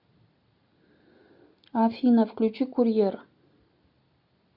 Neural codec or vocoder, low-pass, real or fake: none; 5.4 kHz; real